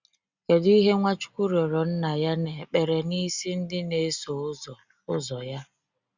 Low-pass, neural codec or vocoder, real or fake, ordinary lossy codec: 7.2 kHz; none; real; Opus, 64 kbps